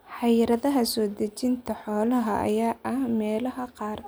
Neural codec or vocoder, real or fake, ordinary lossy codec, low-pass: none; real; none; none